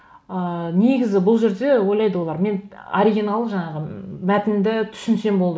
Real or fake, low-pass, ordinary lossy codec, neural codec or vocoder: real; none; none; none